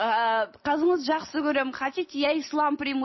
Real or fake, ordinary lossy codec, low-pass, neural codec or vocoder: real; MP3, 24 kbps; 7.2 kHz; none